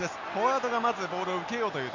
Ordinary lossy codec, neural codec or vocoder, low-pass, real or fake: none; none; 7.2 kHz; real